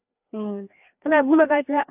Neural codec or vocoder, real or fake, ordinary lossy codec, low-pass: codec, 16 kHz, 2 kbps, FreqCodec, larger model; fake; AAC, 32 kbps; 3.6 kHz